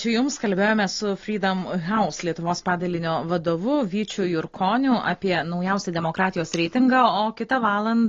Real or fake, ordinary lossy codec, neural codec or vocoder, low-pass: real; AAC, 32 kbps; none; 7.2 kHz